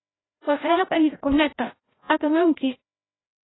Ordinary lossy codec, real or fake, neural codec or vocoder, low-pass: AAC, 16 kbps; fake; codec, 16 kHz, 0.5 kbps, FreqCodec, larger model; 7.2 kHz